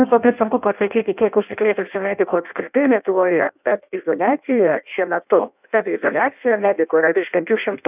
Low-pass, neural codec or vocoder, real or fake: 3.6 kHz; codec, 16 kHz in and 24 kHz out, 0.6 kbps, FireRedTTS-2 codec; fake